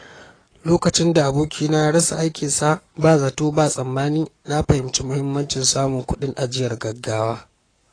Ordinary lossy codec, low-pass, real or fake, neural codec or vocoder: AAC, 32 kbps; 9.9 kHz; fake; codec, 44.1 kHz, 7.8 kbps, Pupu-Codec